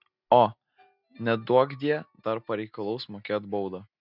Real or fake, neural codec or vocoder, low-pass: real; none; 5.4 kHz